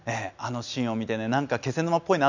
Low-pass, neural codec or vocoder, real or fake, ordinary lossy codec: 7.2 kHz; none; real; none